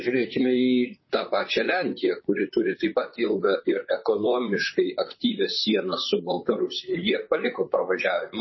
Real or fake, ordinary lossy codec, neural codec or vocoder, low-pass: fake; MP3, 24 kbps; vocoder, 44.1 kHz, 128 mel bands, Pupu-Vocoder; 7.2 kHz